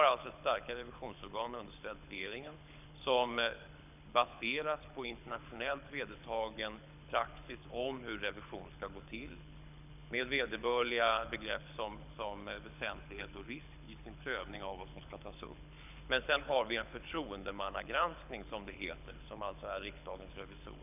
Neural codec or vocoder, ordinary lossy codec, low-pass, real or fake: codec, 24 kHz, 6 kbps, HILCodec; none; 3.6 kHz; fake